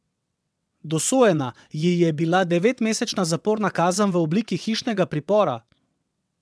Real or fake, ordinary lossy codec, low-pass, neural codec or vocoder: fake; none; none; vocoder, 22.05 kHz, 80 mel bands, WaveNeXt